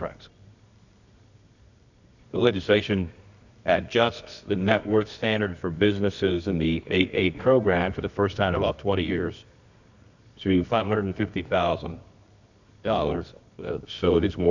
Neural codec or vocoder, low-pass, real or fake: codec, 24 kHz, 0.9 kbps, WavTokenizer, medium music audio release; 7.2 kHz; fake